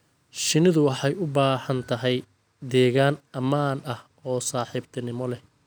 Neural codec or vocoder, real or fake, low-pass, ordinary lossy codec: none; real; none; none